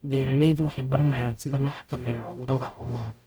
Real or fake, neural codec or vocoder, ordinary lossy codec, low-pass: fake; codec, 44.1 kHz, 0.9 kbps, DAC; none; none